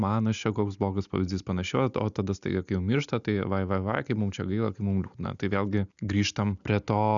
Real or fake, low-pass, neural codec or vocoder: real; 7.2 kHz; none